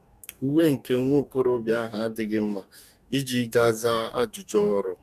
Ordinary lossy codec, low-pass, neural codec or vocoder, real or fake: none; 14.4 kHz; codec, 44.1 kHz, 2.6 kbps, DAC; fake